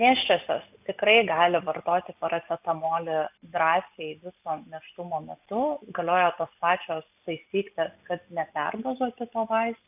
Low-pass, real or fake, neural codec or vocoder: 3.6 kHz; real; none